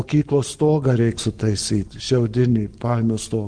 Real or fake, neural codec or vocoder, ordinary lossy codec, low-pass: fake; codec, 44.1 kHz, 7.8 kbps, Pupu-Codec; Opus, 24 kbps; 9.9 kHz